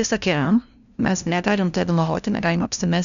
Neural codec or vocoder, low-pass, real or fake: codec, 16 kHz, 0.5 kbps, FunCodec, trained on LibriTTS, 25 frames a second; 7.2 kHz; fake